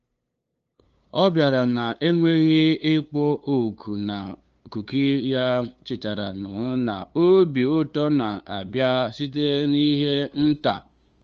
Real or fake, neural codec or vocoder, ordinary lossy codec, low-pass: fake; codec, 16 kHz, 2 kbps, FunCodec, trained on LibriTTS, 25 frames a second; Opus, 24 kbps; 7.2 kHz